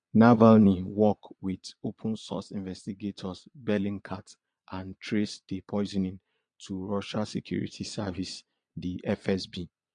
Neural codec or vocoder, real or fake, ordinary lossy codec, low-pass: vocoder, 22.05 kHz, 80 mel bands, Vocos; fake; MP3, 64 kbps; 9.9 kHz